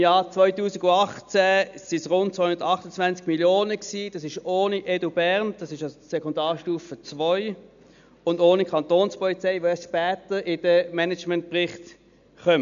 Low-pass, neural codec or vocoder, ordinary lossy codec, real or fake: 7.2 kHz; none; none; real